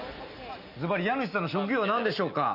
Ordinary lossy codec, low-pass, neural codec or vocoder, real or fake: none; 5.4 kHz; none; real